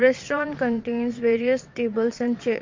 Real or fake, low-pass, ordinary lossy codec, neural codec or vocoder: fake; 7.2 kHz; MP3, 48 kbps; vocoder, 22.05 kHz, 80 mel bands, WaveNeXt